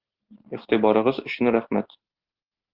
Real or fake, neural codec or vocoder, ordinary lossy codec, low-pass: real; none; Opus, 16 kbps; 5.4 kHz